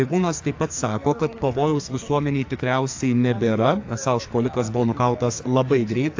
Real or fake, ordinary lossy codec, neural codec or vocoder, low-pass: fake; AAC, 48 kbps; codec, 44.1 kHz, 2.6 kbps, SNAC; 7.2 kHz